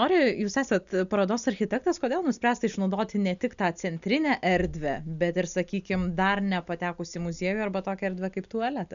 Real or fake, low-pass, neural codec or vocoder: real; 7.2 kHz; none